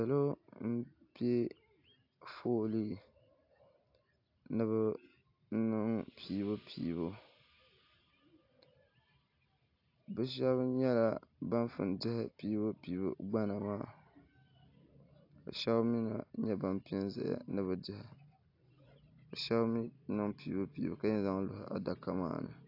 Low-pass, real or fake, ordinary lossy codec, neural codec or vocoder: 5.4 kHz; real; AAC, 32 kbps; none